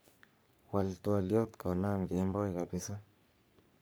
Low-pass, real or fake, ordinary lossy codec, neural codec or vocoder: none; fake; none; codec, 44.1 kHz, 3.4 kbps, Pupu-Codec